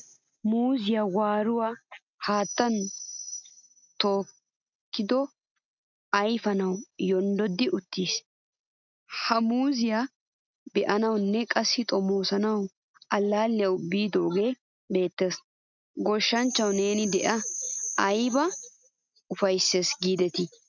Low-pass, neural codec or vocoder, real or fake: 7.2 kHz; none; real